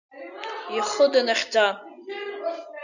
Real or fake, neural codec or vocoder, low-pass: real; none; 7.2 kHz